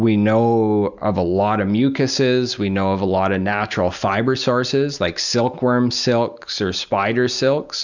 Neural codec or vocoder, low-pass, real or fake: none; 7.2 kHz; real